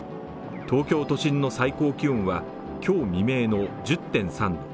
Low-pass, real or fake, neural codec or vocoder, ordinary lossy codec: none; real; none; none